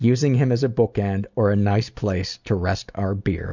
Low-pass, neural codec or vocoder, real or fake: 7.2 kHz; none; real